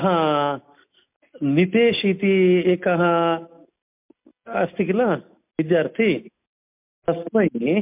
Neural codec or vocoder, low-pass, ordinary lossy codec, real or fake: none; 3.6 kHz; MP3, 32 kbps; real